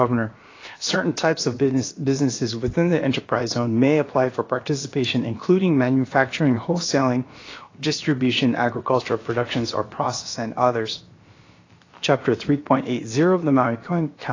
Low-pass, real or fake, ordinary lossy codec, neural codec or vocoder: 7.2 kHz; fake; AAC, 32 kbps; codec, 16 kHz, about 1 kbps, DyCAST, with the encoder's durations